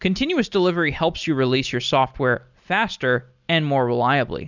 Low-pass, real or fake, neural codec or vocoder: 7.2 kHz; real; none